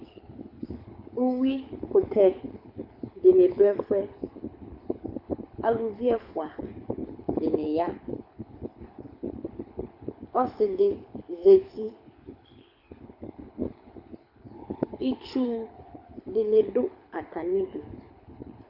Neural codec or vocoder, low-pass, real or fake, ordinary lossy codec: codec, 24 kHz, 6 kbps, HILCodec; 5.4 kHz; fake; AAC, 48 kbps